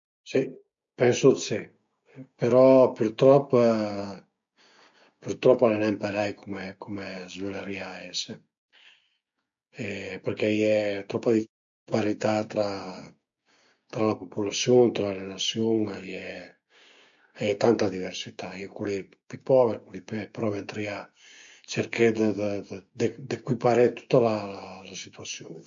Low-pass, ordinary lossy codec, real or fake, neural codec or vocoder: 7.2 kHz; MP3, 48 kbps; fake; codec, 16 kHz, 6 kbps, DAC